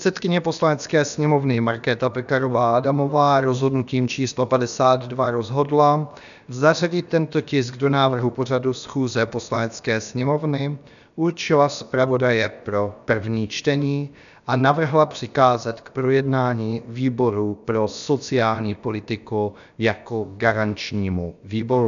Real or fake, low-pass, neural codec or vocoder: fake; 7.2 kHz; codec, 16 kHz, about 1 kbps, DyCAST, with the encoder's durations